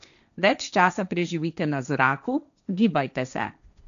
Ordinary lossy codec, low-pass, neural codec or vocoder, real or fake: none; 7.2 kHz; codec, 16 kHz, 1.1 kbps, Voila-Tokenizer; fake